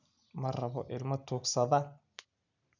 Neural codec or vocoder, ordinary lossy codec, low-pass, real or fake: none; none; 7.2 kHz; real